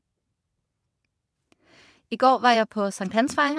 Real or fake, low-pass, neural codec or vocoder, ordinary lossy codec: fake; none; vocoder, 22.05 kHz, 80 mel bands, WaveNeXt; none